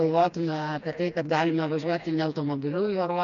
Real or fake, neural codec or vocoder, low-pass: fake; codec, 16 kHz, 2 kbps, FreqCodec, smaller model; 7.2 kHz